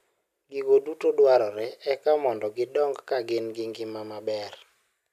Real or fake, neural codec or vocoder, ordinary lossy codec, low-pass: real; none; none; 14.4 kHz